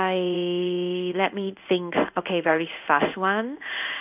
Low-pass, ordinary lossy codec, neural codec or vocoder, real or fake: 3.6 kHz; none; codec, 16 kHz in and 24 kHz out, 1 kbps, XY-Tokenizer; fake